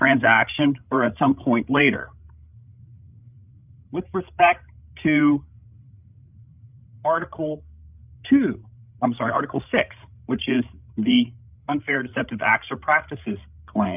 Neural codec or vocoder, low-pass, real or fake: codec, 16 kHz, 16 kbps, FreqCodec, larger model; 3.6 kHz; fake